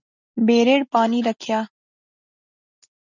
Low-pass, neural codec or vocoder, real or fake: 7.2 kHz; none; real